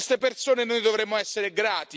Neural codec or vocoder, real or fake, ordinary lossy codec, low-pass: none; real; none; none